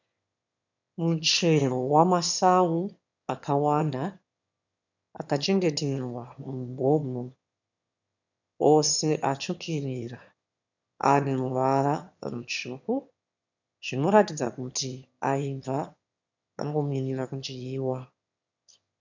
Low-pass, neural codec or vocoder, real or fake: 7.2 kHz; autoencoder, 22.05 kHz, a latent of 192 numbers a frame, VITS, trained on one speaker; fake